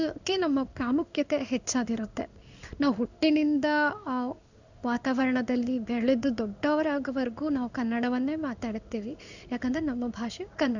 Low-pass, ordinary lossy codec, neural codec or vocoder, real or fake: 7.2 kHz; none; codec, 16 kHz in and 24 kHz out, 1 kbps, XY-Tokenizer; fake